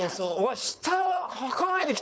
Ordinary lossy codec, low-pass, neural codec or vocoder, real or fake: none; none; codec, 16 kHz, 4.8 kbps, FACodec; fake